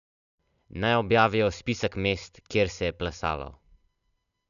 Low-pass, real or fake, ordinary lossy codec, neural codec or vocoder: 7.2 kHz; real; none; none